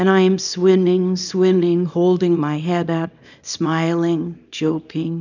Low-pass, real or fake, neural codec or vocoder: 7.2 kHz; fake; codec, 24 kHz, 0.9 kbps, WavTokenizer, small release